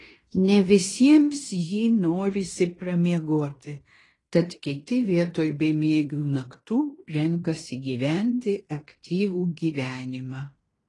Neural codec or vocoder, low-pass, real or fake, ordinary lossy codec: codec, 16 kHz in and 24 kHz out, 0.9 kbps, LongCat-Audio-Codec, fine tuned four codebook decoder; 10.8 kHz; fake; AAC, 32 kbps